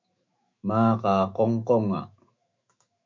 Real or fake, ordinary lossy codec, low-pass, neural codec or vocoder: fake; MP3, 64 kbps; 7.2 kHz; autoencoder, 48 kHz, 128 numbers a frame, DAC-VAE, trained on Japanese speech